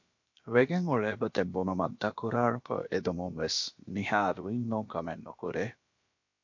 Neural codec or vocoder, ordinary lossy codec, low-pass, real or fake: codec, 16 kHz, about 1 kbps, DyCAST, with the encoder's durations; MP3, 48 kbps; 7.2 kHz; fake